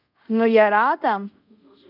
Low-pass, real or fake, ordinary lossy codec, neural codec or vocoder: 5.4 kHz; fake; none; codec, 24 kHz, 0.5 kbps, DualCodec